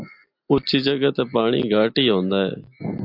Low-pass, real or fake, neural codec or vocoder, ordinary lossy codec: 5.4 kHz; real; none; MP3, 48 kbps